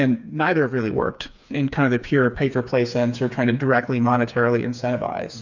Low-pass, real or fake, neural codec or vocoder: 7.2 kHz; fake; codec, 16 kHz, 4 kbps, FreqCodec, smaller model